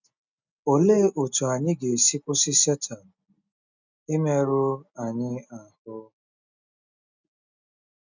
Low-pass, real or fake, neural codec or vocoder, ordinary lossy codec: 7.2 kHz; real; none; none